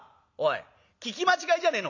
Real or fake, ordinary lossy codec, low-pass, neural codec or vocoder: real; none; 7.2 kHz; none